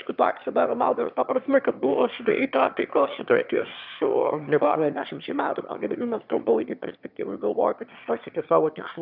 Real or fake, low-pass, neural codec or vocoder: fake; 5.4 kHz; autoencoder, 22.05 kHz, a latent of 192 numbers a frame, VITS, trained on one speaker